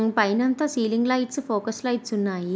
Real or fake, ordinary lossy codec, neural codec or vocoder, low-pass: real; none; none; none